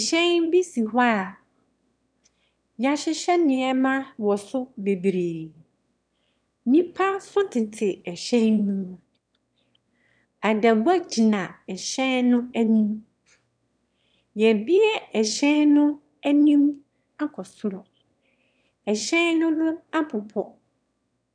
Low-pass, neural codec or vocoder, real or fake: 9.9 kHz; autoencoder, 22.05 kHz, a latent of 192 numbers a frame, VITS, trained on one speaker; fake